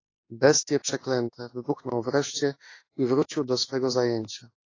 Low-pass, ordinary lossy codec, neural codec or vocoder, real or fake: 7.2 kHz; AAC, 32 kbps; autoencoder, 48 kHz, 32 numbers a frame, DAC-VAE, trained on Japanese speech; fake